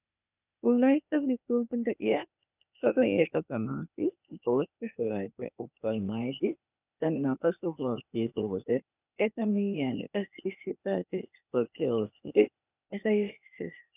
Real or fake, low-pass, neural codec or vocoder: fake; 3.6 kHz; codec, 16 kHz, 0.8 kbps, ZipCodec